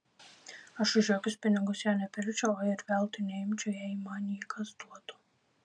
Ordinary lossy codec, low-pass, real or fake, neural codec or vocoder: MP3, 96 kbps; 9.9 kHz; real; none